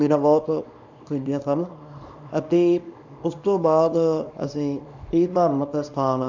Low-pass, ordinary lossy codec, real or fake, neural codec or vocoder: 7.2 kHz; none; fake; codec, 24 kHz, 0.9 kbps, WavTokenizer, small release